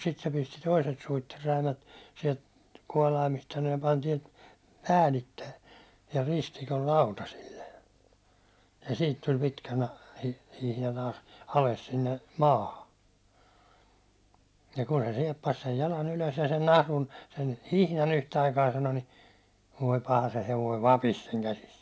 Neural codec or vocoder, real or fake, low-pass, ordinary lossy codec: none; real; none; none